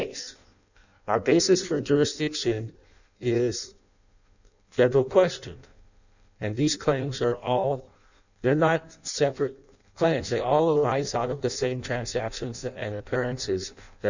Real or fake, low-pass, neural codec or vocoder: fake; 7.2 kHz; codec, 16 kHz in and 24 kHz out, 0.6 kbps, FireRedTTS-2 codec